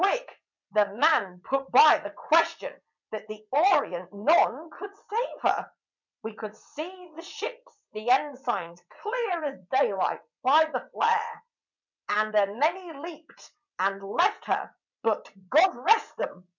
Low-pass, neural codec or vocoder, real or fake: 7.2 kHz; vocoder, 22.05 kHz, 80 mel bands, WaveNeXt; fake